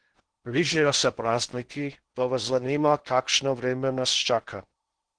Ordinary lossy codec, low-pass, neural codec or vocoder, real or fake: Opus, 16 kbps; 9.9 kHz; codec, 16 kHz in and 24 kHz out, 0.6 kbps, FocalCodec, streaming, 2048 codes; fake